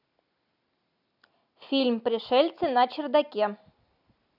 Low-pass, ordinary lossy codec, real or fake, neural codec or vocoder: 5.4 kHz; none; real; none